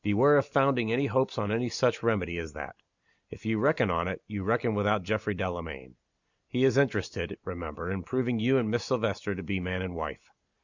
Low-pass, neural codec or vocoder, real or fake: 7.2 kHz; none; real